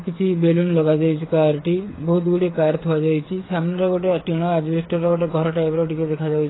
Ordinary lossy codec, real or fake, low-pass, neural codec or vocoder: AAC, 16 kbps; fake; 7.2 kHz; codec, 16 kHz, 8 kbps, FreqCodec, smaller model